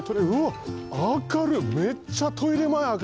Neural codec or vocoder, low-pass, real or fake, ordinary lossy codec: none; none; real; none